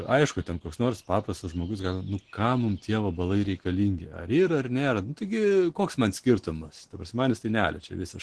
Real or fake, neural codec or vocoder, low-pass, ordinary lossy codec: real; none; 10.8 kHz; Opus, 16 kbps